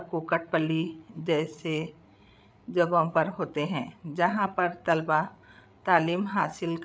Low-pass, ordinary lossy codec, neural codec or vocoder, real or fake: none; none; codec, 16 kHz, 8 kbps, FreqCodec, larger model; fake